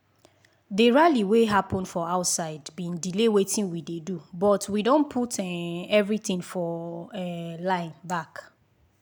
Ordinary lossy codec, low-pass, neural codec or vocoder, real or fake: none; none; none; real